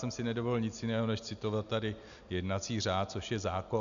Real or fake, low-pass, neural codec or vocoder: real; 7.2 kHz; none